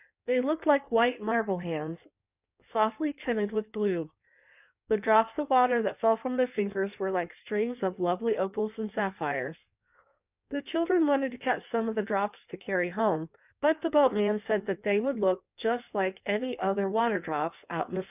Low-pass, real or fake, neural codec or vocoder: 3.6 kHz; fake; codec, 16 kHz in and 24 kHz out, 1.1 kbps, FireRedTTS-2 codec